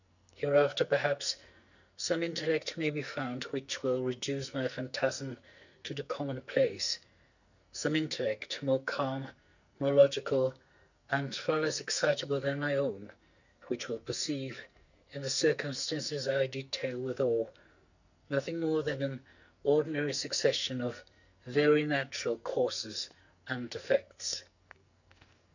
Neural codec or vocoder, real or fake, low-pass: codec, 44.1 kHz, 2.6 kbps, SNAC; fake; 7.2 kHz